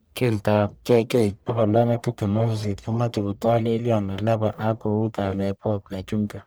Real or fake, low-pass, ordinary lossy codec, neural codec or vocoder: fake; none; none; codec, 44.1 kHz, 1.7 kbps, Pupu-Codec